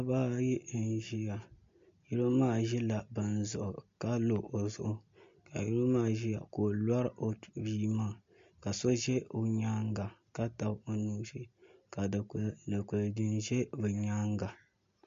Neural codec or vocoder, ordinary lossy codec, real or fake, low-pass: none; MP3, 48 kbps; real; 7.2 kHz